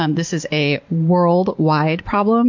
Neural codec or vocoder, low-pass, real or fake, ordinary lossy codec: none; 7.2 kHz; real; MP3, 48 kbps